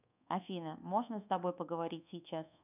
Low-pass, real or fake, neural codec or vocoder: 3.6 kHz; fake; codec, 24 kHz, 1.2 kbps, DualCodec